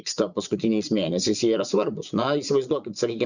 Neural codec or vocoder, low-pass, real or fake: vocoder, 24 kHz, 100 mel bands, Vocos; 7.2 kHz; fake